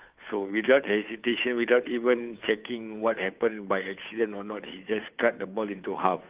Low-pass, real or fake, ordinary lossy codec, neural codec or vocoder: 3.6 kHz; fake; Opus, 32 kbps; codec, 16 kHz, 2 kbps, FunCodec, trained on Chinese and English, 25 frames a second